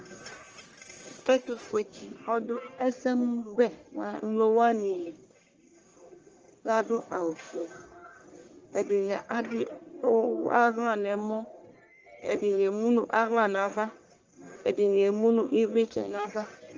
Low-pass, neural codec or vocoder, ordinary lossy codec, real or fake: 7.2 kHz; codec, 44.1 kHz, 1.7 kbps, Pupu-Codec; Opus, 24 kbps; fake